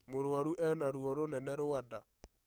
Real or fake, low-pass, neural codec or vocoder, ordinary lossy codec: fake; none; codec, 44.1 kHz, 7.8 kbps, DAC; none